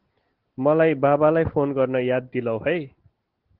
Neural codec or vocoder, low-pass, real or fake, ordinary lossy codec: none; 5.4 kHz; real; Opus, 16 kbps